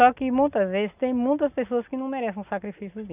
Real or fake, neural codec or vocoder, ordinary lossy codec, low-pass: real; none; none; 3.6 kHz